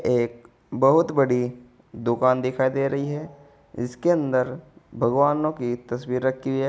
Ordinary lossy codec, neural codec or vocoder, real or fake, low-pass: none; none; real; none